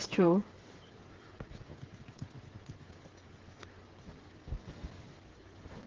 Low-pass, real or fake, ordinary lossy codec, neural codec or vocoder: 7.2 kHz; fake; Opus, 16 kbps; codec, 16 kHz, 8 kbps, FreqCodec, smaller model